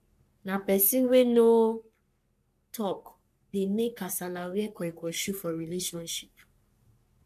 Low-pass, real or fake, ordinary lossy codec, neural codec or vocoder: 14.4 kHz; fake; none; codec, 44.1 kHz, 3.4 kbps, Pupu-Codec